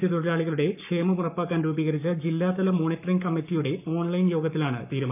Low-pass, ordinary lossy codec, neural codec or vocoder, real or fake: 3.6 kHz; none; codec, 44.1 kHz, 7.8 kbps, Pupu-Codec; fake